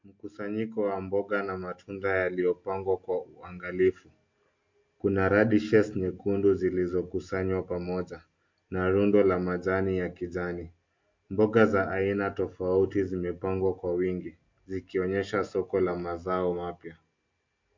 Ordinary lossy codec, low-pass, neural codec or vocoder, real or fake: MP3, 48 kbps; 7.2 kHz; none; real